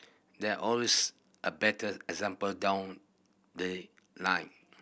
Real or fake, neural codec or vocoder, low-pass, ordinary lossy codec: real; none; none; none